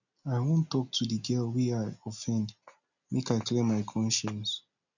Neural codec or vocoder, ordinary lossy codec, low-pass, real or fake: none; none; 7.2 kHz; real